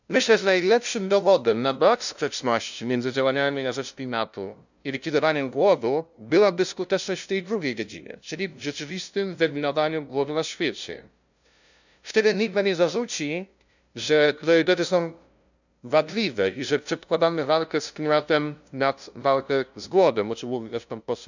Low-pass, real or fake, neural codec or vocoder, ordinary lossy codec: 7.2 kHz; fake; codec, 16 kHz, 0.5 kbps, FunCodec, trained on LibriTTS, 25 frames a second; none